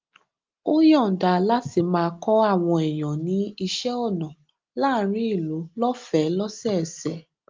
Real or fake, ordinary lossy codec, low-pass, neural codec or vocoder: real; Opus, 24 kbps; 7.2 kHz; none